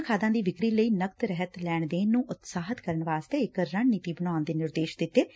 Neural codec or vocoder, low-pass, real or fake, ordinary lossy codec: none; none; real; none